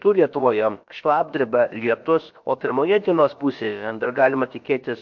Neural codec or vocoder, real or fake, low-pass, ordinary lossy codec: codec, 16 kHz, about 1 kbps, DyCAST, with the encoder's durations; fake; 7.2 kHz; MP3, 48 kbps